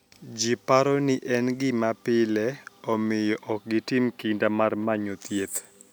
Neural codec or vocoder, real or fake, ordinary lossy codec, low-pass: none; real; none; none